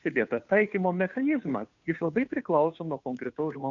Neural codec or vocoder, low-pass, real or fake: codec, 16 kHz, 2 kbps, FunCodec, trained on Chinese and English, 25 frames a second; 7.2 kHz; fake